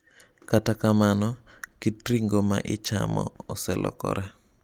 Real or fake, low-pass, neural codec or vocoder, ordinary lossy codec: real; 19.8 kHz; none; Opus, 32 kbps